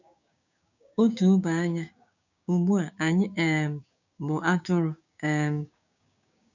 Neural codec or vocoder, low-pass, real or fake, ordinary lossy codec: codec, 16 kHz, 6 kbps, DAC; 7.2 kHz; fake; none